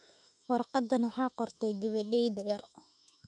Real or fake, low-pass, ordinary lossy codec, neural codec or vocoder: fake; 10.8 kHz; none; autoencoder, 48 kHz, 32 numbers a frame, DAC-VAE, trained on Japanese speech